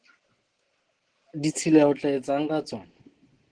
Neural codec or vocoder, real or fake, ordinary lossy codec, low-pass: none; real; Opus, 16 kbps; 9.9 kHz